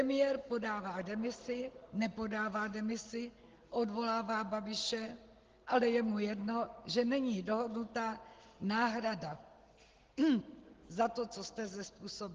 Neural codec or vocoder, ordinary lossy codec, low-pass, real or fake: none; Opus, 16 kbps; 7.2 kHz; real